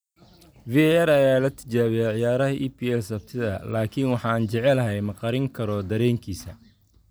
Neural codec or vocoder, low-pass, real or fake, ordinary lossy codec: none; none; real; none